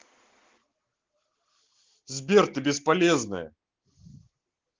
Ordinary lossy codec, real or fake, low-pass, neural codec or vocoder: Opus, 16 kbps; real; 7.2 kHz; none